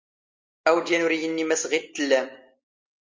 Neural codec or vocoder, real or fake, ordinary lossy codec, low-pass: none; real; Opus, 32 kbps; 7.2 kHz